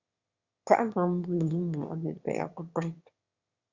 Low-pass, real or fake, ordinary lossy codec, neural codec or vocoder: 7.2 kHz; fake; Opus, 64 kbps; autoencoder, 22.05 kHz, a latent of 192 numbers a frame, VITS, trained on one speaker